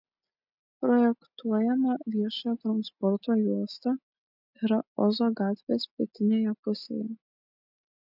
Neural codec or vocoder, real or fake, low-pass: none; real; 5.4 kHz